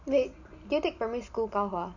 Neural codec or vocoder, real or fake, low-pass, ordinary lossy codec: none; real; 7.2 kHz; none